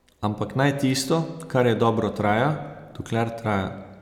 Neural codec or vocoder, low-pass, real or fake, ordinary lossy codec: none; 19.8 kHz; real; none